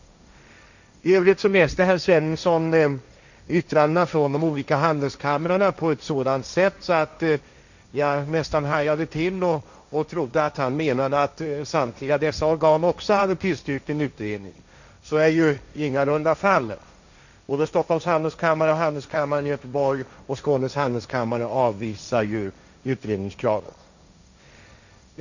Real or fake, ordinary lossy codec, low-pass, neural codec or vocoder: fake; none; 7.2 kHz; codec, 16 kHz, 1.1 kbps, Voila-Tokenizer